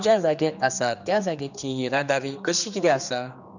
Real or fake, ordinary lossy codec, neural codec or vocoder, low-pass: fake; none; codec, 16 kHz, 2 kbps, X-Codec, HuBERT features, trained on general audio; 7.2 kHz